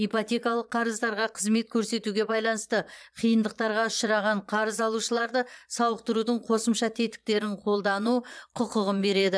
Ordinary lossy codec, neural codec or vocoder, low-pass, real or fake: none; vocoder, 22.05 kHz, 80 mel bands, Vocos; none; fake